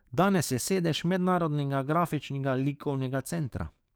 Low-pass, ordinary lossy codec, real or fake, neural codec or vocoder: none; none; fake; codec, 44.1 kHz, 7.8 kbps, DAC